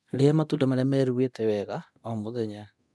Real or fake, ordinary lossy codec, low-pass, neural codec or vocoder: fake; none; none; codec, 24 kHz, 0.9 kbps, DualCodec